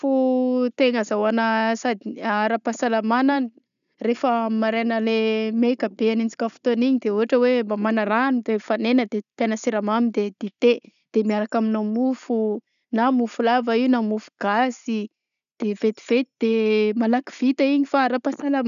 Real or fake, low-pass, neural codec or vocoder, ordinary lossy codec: real; 7.2 kHz; none; none